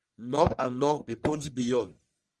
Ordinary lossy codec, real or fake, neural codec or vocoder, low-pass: Opus, 32 kbps; fake; codec, 44.1 kHz, 1.7 kbps, Pupu-Codec; 10.8 kHz